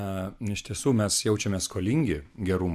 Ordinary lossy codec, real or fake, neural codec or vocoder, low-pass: AAC, 96 kbps; real; none; 14.4 kHz